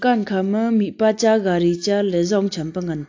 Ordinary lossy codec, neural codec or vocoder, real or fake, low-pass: AAC, 48 kbps; none; real; 7.2 kHz